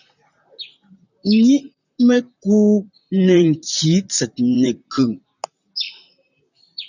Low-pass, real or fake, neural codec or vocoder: 7.2 kHz; fake; vocoder, 44.1 kHz, 128 mel bands, Pupu-Vocoder